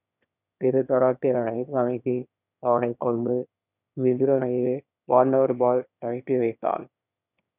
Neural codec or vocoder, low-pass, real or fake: autoencoder, 22.05 kHz, a latent of 192 numbers a frame, VITS, trained on one speaker; 3.6 kHz; fake